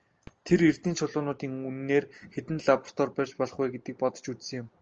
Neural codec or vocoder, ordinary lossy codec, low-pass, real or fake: none; Opus, 24 kbps; 7.2 kHz; real